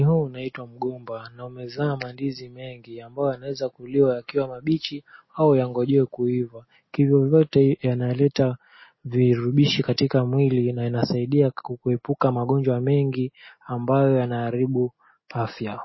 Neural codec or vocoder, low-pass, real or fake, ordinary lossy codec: none; 7.2 kHz; real; MP3, 24 kbps